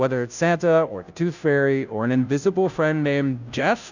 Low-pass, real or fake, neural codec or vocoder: 7.2 kHz; fake; codec, 16 kHz, 0.5 kbps, FunCodec, trained on Chinese and English, 25 frames a second